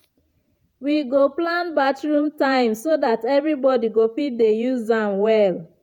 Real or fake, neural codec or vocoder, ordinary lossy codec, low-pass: fake; vocoder, 48 kHz, 128 mel bands, Vocos; none; 19.8 kHz